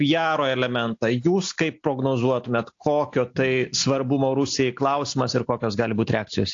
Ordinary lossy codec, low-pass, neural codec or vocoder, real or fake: AAC, 48 kbps; 7.2 kHz; none; real